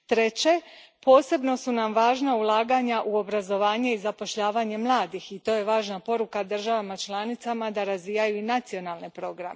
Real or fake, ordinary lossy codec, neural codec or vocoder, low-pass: real; none; none; none